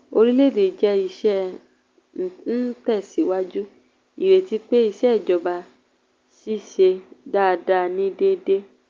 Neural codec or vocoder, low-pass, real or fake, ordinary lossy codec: none; 7.2 kHz; real; Opus, 32 kbps